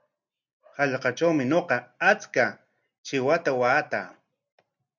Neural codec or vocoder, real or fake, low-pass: none; real; 7.2 kHz